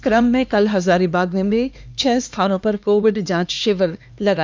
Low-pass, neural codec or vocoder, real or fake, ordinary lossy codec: none; codec, 16 kHz, 2 kbps, X-Codec, HuBERT features, trained on LibriSpeech; fake; none